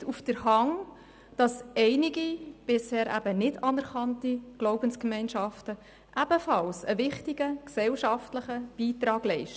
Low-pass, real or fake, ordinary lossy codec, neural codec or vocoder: none; real; none; none